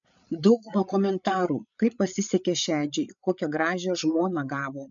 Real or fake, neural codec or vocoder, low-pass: fake; codec, 16 kHz, 8 kbps, FreqCodec, larger model; 7.2 kHz